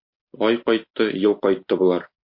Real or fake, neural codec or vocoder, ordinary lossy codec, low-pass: real; none; MP3, 32 kbps; 5.4 kHz